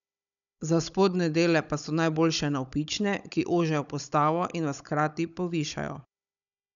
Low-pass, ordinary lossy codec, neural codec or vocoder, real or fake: 7.2 kHz; none; codec, 16 kHz, 16 kbps, FunCodec, trained on Chinese and English, 50 frames a second; fake